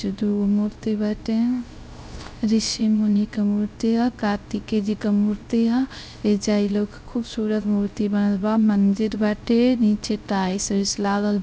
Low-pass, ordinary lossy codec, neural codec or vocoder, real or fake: none; none; codec, 16 kHz, 0.3 kbps, FocalCodec; fake